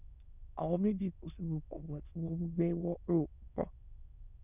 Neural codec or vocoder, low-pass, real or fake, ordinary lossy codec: autoencoder, 22.05 kHz, a latent of 192 numbers a frame, VITS, trained on many speakers; 3.6 kHz; fake; none